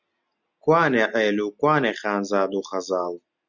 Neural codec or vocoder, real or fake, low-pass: none; real; 7.2 kHz